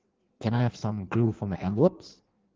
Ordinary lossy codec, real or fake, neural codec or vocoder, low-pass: Opus, 16 kbps; fake; codec, 16 kHz in and 24 kHz out, 1.1 kbps, FireRedTTS-2 codec; 7.2 kHz